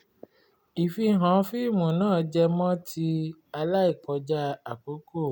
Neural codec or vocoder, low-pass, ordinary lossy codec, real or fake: none; 19.8 kHz; none; real